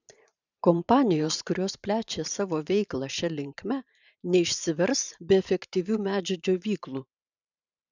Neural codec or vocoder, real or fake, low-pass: vocoder, 44.1 kHz, 128 mel bands every 512 samples, BigVGAN v2; fake; 7.2 kHz